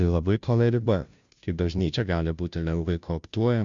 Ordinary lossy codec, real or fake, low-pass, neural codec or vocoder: Opus, 64 kbps; fake; 7.2 kHz; codec, 16 kHz, 0.5 kbps, FunCodec, trained on Chinese and English, 25 frames a second